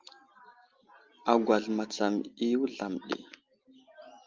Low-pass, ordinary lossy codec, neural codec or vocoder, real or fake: 7.2 kHz; Opus, 32 kbps; none; real